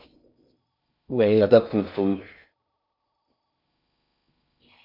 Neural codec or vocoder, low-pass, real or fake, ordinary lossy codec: codec, 16 kHz in and 24 kHz out, 0.6 kbps, FocalCodec, streaming, 4096 codes; 5.4 kHz; fake; MP3, 48 kbps